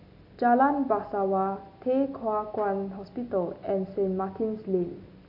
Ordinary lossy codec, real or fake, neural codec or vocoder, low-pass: none; real; none; 5.4 kHz